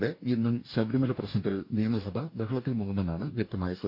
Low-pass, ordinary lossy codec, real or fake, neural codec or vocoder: 5.4 kHz; none; fake; codec, 44.1 kHz, 2.6 kbps, DAC